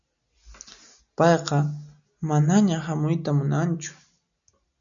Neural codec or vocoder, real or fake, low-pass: none; real; 7.2 kHz